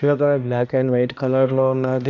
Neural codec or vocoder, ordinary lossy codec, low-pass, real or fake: codec, 16 kHz, 1 kbps, X-Codec, HuBERT features, trained on balanced general audio; none; 7.2 kHz; fake